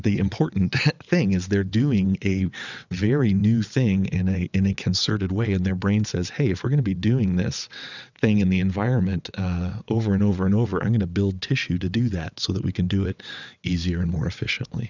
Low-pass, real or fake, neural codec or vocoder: 7.2 kHz; fake; vocoder, 22.05 kHz, 80 mel bands, WaveNeXt